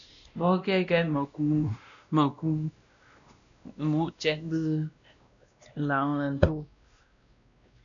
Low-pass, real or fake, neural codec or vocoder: 7.2 kHz; fake; codec, 16 kHz, 1 kbps, X-Codec, WavLM features, trained on Multilingual LibriSpeech